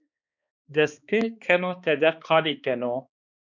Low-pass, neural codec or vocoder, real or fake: 7.2 kHz; codec, 16 kHz, 2 kbps, X-Codec, HuBERT features, trained on balanced general audio; fake